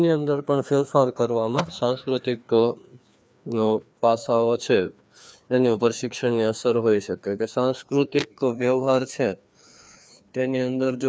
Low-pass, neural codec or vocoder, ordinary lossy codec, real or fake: none; codec, 16 kHz, 2 kbps, FreqCodec, larger model; none; fake